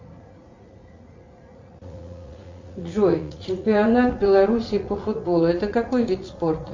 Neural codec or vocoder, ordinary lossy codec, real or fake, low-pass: vocoder, 44.1 kHz, 80 mel bands, Vocos; MP3, 48 kbps; fake; 7.2 kHz